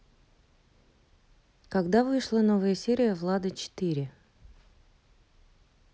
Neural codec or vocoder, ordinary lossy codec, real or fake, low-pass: none; none; real; none